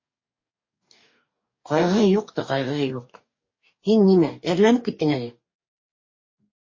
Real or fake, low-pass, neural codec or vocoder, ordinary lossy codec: fake; 7.2 kHz; codec, 44.1 kHz, 2.6 kbps, DAC; MP3, 32 kbps